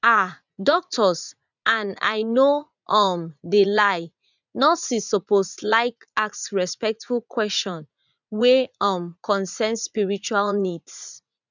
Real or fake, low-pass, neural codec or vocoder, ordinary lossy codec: fake; 7.2 kHz; vocoder, 44.1 kHz, 80 mel bands, Vocos; none